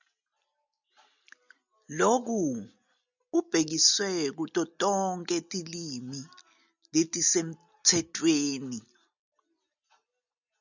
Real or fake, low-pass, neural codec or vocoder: real; 7.2 kHz; none